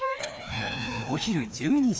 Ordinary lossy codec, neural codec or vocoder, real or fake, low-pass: none; codec, 16 kHz, 2 kbps, FreqCodec, larger model; fake; none